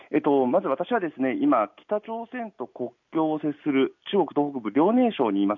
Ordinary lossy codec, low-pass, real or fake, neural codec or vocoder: MP3, 48 kbps; 7.2 kHz; real; none